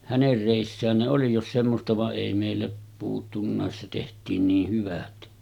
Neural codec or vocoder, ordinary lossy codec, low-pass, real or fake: none; none; 19.8 kHz; real